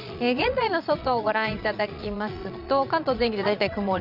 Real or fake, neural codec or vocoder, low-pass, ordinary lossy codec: fake; vocoder, 22.05 kHz, 80 mel bands, Vocos; 5.4 kHz; none